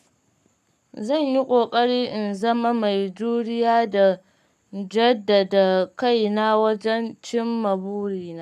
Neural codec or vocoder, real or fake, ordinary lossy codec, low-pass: codec, 44.1 kHz, 7.8 kbps, Pupu-Codec; fake; none; 14.4 kHz